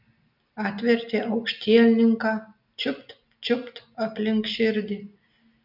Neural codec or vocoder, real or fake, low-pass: none; real; 5.4 kHz